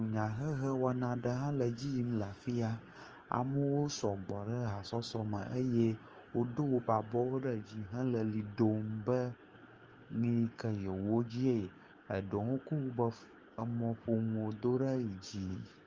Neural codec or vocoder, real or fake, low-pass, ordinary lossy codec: codec, 16 kHz, 6 kbps, DAC; fake; 7.2 kHz; Opus, 24 kbps